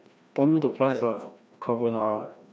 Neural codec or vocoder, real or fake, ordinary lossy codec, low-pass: codec, 16 kHz, 1 kbps, FreqCodec, larger model; fake; none; none